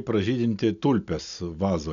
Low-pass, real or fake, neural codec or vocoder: 7.2 kHz; real; none